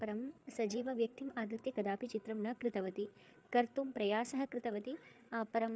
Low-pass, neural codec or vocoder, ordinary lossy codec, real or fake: none; codec, 16 kHz, 8 kbps, FreqCodec, larger model; none; fake